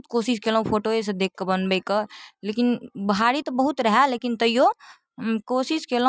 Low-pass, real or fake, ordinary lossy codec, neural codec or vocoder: none; real; none; none